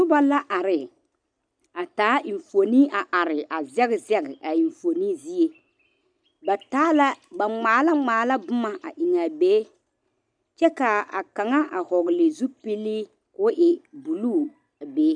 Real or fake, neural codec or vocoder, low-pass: real; none; 9.9 kHz